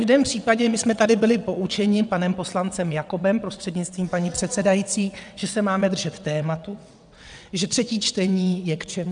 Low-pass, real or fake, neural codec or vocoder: 9.9 kHz; fake; vocoder, 22.05 kHz, 80 mel bands, WaveNeXt